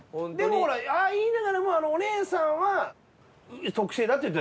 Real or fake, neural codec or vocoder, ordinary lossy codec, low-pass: real; none; none; none